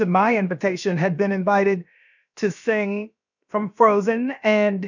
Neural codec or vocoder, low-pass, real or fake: codec, 16 kHz, about 1 kbps, DyCAST, with the encoder's durations; 7.2 kHz; fake